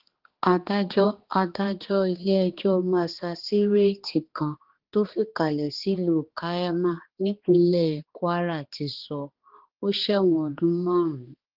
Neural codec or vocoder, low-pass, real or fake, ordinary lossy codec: codec, 16 kHz, 2 kbps, X-Codec, HuBERT features, trained on balanced general audio; 5.4 kHz; fake; Opus, 16 kbps